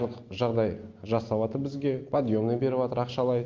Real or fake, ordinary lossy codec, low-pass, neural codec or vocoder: real; Opus, 32 kbps; 7.2 kHz; none